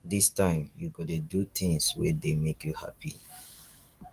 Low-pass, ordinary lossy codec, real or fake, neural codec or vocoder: 14.4 kHz; Opus, 32 kbps; fake; codec, 44.1 kHz, 7.8 kbps, DAC